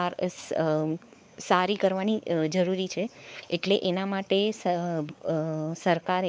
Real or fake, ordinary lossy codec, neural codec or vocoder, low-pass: fake; none; codec, 16 kHz, 4 kbps, X-Codec, WavLM features, trained on Multilingual LibriSpeech; none